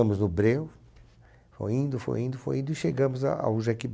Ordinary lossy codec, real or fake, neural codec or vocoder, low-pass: none; real; none; none